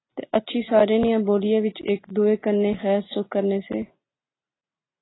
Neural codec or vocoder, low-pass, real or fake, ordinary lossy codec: none; 7.2 kHz; real; AAC, 16 kbps